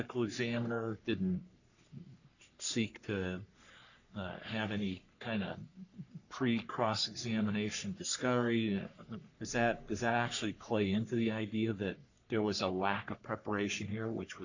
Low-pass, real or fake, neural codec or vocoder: 7.2 kHz; fake; codec, 44.1 kHz, 3.4 kbps, Pupu-Codec